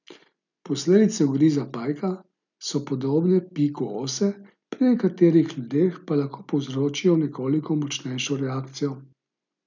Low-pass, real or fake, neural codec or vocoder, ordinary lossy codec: 7.2 kHz; real; none; none